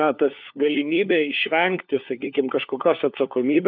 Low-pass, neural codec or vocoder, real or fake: 5.4 kHz; codec, 16 kHz, 4 kbps, FunCodec, trained on LibriTTS, 50 frames a second; fake